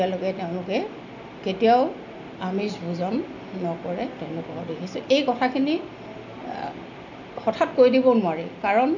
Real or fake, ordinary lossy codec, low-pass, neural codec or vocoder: real; none; 7.2 kHz; none